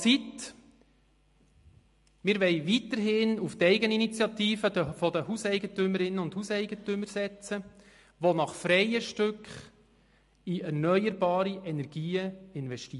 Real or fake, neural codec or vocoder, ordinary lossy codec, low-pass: real; none; MP3, 48 kbps; 14.4 kHz